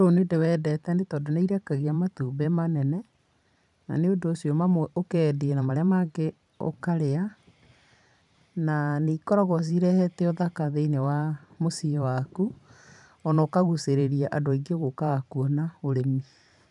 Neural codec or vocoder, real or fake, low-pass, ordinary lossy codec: vocoder, 44.1 kHz, 128 mel bands every 512 samples, BigVGAN v2; fake; 10.8 kHz; none